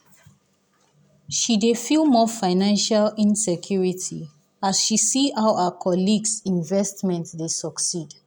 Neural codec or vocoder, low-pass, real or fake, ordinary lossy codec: none; none; real; none